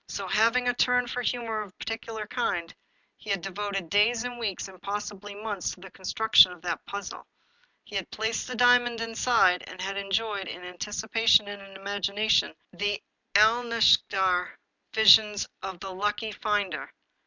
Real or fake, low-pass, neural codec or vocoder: real; 7.2 kHz; none